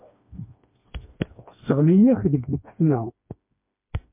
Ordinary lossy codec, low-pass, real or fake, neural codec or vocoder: MP3, 32 kbps; 3.6 kHz; fake; codec, 16 kHz, 2 kbps, FreqCodec, smaller model